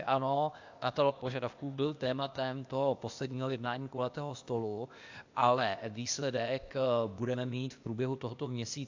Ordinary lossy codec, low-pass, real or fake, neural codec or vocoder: AAC, 48 kbps; 7.2 kHz; fake; codec, 16 kHz, 0.8 kbps, ZipCodec